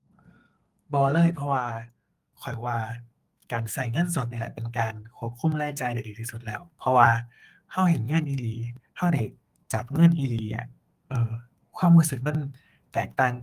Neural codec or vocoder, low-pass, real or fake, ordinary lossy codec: codec, 32 kHz, 1.9 kbps, SNAC; 14.4 kHz; fake; Opus, 32 kbps